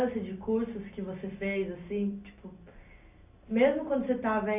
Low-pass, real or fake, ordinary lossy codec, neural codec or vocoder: 3.6 kHz; real; AAC, 32 kbps; none